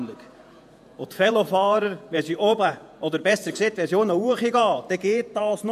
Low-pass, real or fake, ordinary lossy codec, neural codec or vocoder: 14.4 kHz; fake; AAC, 96 kbps; vocoder, 48 kHz, 128 mel bands, Vocos